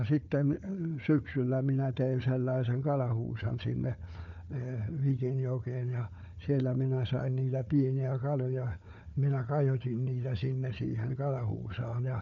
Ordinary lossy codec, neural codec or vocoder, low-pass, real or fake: none; codec, 16 kHz, 4 kbps, FunCodec, trained on Chinese and English, 50 frames a second; 7.2 kHz; fake